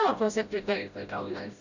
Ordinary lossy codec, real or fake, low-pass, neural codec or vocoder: none; fake; 7.2 kHz; codec, 16 kHz, 0.5 kbps, FreqCodec, smaller model